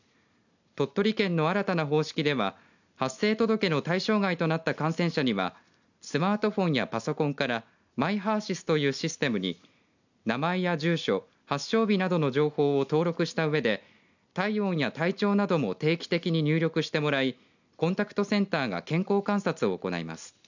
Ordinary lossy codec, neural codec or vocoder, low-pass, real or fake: none; none; 7.2 kHz; real